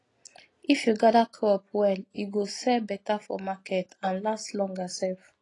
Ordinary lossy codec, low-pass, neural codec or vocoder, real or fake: AAC, 32 kbps; 10.8 kHz; none; real